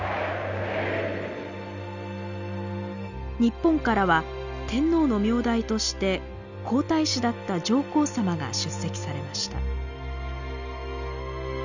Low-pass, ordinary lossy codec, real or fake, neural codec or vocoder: 7.2 kHz; none; real; none